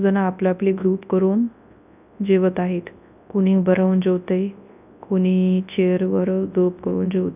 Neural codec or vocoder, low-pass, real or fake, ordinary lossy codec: codec, 24 kHz, 0.9 kbps, WavTokenizer, large speech release; 3.6 kHz; fake; none